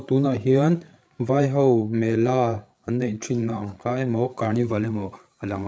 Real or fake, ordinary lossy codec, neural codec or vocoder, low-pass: fake; none; codec, 16 kHz, 8 kbps, FreqCodec, larger model; none